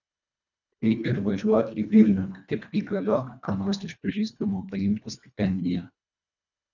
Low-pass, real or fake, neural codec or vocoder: 7.2 kHz; fake; codec, 24 kHz, 1.5 kbps, HILCodec